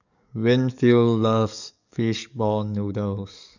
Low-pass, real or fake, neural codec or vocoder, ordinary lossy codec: 7.2 kHz; fake; codec, 44.1 kHz, 7.8 kbps, DAC; none